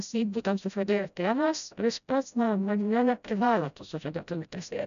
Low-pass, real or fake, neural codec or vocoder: 7.2 kHz; fake; codec, 16 kHz, 0.5 kbps, FreqCodec, smaller model